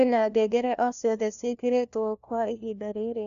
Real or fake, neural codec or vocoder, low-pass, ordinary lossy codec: fake; codec, 16 kHz, 1 kbps, FunCodec, trained on LibriTTS, 50 frames a second; 7.2 kHz; AAC, 96 kbps